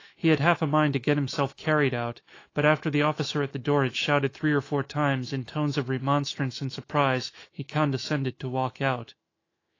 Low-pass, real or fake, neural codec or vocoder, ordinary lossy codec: 7.2 kHz; fake; autoencoder, 48 kHz, 128 numbers a frame, DAC-VAE, trained on Japanese speech; AAC, 32 kbps